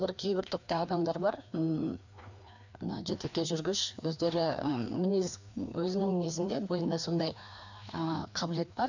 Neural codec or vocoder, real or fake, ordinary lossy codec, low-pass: codec, 16 kHz, 2 kbps, FreqCodec, larger model; fake; none; 7.2 kHz